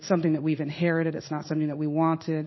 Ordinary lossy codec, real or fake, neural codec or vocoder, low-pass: MP3, 24 kbps; fake; codec, 16 kHz in and 24 kHz out, 1 kbps, XY-Tokenizer; 7.2 kHz